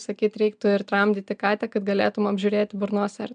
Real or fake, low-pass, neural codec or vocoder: real; 9.9 kHz; none